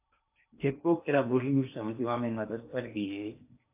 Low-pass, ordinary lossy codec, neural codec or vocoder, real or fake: 3.6 kHz; AAC, 32 kbps; codec, 16 kHz in and 24 kHz out, 0.8 kbps, FocalCodec, streaming, 65536 codes; fake